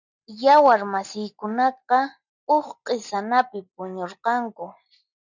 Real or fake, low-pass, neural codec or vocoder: real; 7.2 kHz; none